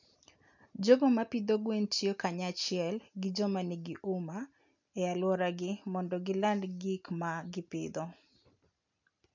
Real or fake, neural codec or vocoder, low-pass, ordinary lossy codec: real; none; 7.2 kHz; none